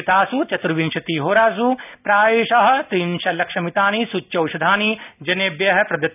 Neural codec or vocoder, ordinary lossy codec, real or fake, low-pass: none; none; real; 3.6 kHz